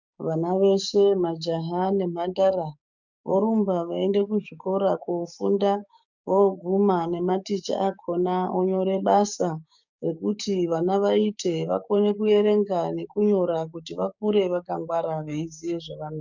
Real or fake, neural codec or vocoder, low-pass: fake; codec, 16 kHz, 6 kbps, DAC; 7.2 kHz